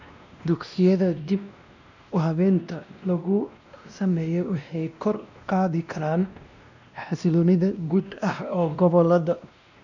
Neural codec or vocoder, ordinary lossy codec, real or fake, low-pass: codec, 16 kHz, 1 kbps, X-Codec, WavLM features, trained on Multilingual LibriSpeech; none; fake; 7.2 kHz